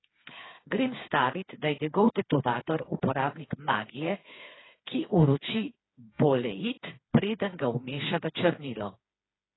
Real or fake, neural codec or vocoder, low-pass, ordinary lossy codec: fake; codec, 16 kHz, 4 kbps, FreqCodec, smaller model; 7.2 kHz; AAC, 16 kbps